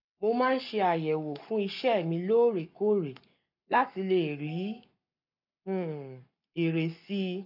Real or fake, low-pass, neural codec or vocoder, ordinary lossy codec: fake; 5.4 kHz; codec, 44.1 kHz, 7.8 kbps, Pupu-Codec; none